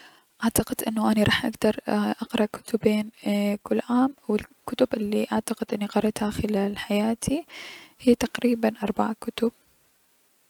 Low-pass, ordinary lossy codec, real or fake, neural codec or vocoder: 19.8 kHz; none; real; none